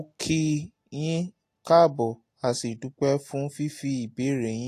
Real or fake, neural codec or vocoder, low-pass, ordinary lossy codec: real; none; 14.4 kHz; AAC, 48 kbps